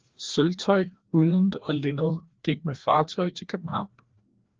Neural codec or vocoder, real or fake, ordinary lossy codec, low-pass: codec, 16 kHz, 1 kbps, FreqCodec, larger model; fake; Opus, 16 kbps; 7.2 kHz